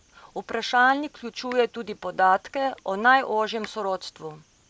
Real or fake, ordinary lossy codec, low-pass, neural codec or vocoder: real; none; none; none